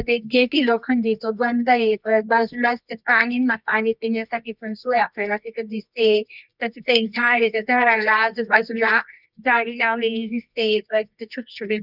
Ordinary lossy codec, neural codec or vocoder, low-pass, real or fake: none; codec, 24 kHz, 0.9 kbps, WavTokenizer, medium music audio release; 5.4 kHz; fake